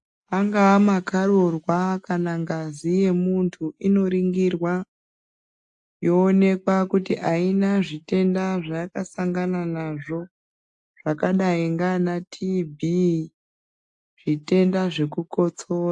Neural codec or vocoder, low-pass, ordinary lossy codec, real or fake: none; 10.8 kHz; AAC, 64 kbps; real